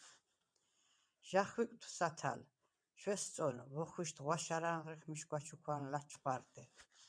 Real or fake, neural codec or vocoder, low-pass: fake; vocoder, 22.05 kHz, 80 mel bands, WaveNeXt; 9.9 kHz